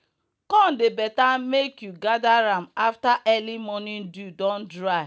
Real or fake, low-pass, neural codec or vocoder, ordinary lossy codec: real; none; none; none